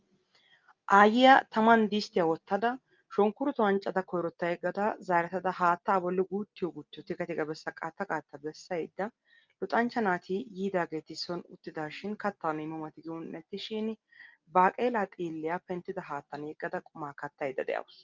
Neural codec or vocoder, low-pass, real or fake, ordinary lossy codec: none; 7.2 kHz; real; Opus, 24 kbps